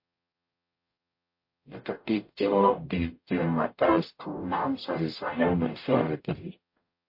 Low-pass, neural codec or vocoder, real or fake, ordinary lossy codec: 5.4 kHz; codec, 44.1 kHz, 0.9 kbps, DAC; fake; MP3, 32 kbps